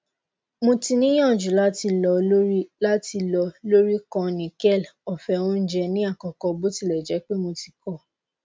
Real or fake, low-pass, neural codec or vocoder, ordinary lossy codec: real; none; none; none